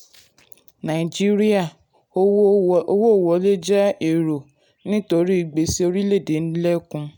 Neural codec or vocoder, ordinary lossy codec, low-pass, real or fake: none; none; 19.8 kHz; real